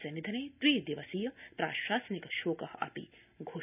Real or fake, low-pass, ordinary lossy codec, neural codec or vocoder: real; 3.6 kHz; none; none